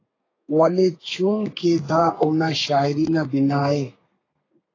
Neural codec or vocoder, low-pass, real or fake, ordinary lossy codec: codec, 32 kHz, 1.9 kbps, SNAC; 7.2 kHz; fake; AAC, 32 kbps